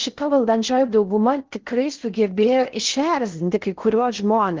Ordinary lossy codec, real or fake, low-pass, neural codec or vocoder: Opus, 16 kbps; fake; 7.2 kHz; codec, 16 kHz in and 24 kHz out, 0.6 kbps, FocalCodec, streaming, 4096 codes